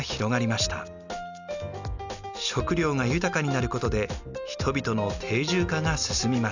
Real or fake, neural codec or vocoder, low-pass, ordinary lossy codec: real; none; 7.2 kHz; none